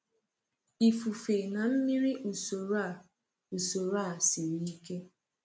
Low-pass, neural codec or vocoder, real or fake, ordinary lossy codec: none; none; real; none